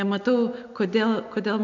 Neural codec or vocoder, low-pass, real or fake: none; 7.2 kHz; real